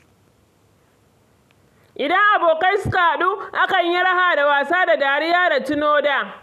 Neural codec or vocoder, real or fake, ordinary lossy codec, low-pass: none; real; none; 14.4 kHz